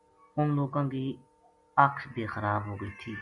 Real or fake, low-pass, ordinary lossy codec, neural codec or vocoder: real; 10.8 kHz; MP3, 64 kbps; none